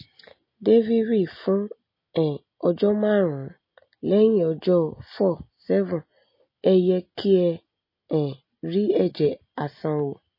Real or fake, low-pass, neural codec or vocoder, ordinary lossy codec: real; 5.4 kHz; none; MP3, 24 kbps